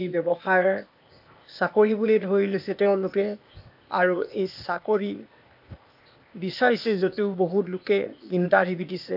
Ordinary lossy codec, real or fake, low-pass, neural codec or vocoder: AAC, 48 kbps; fake; 5.4 kHz; codec, 16 kHz, 0.8 kbps, ZipCodec